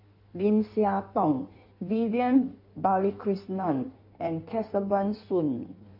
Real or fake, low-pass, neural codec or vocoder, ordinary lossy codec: fake; 5.4 kHz; codec, 16 kHz in and 24 kHz out, 1.1 kbps, FireRedTTS-2 codec; MP3, 32 kbps